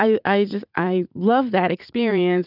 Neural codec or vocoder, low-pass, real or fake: vocoder, 44.1 kHz, 80 mel bands, Vocos; 5.4 kHz; fake